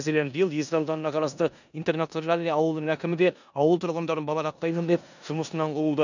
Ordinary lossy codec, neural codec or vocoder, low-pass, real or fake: none; codec, 16 kHz in and 24 kHz out, 0.9 kbps, LongCat-Audio-Codec, four codebook decoder; 7.2 kHz; fake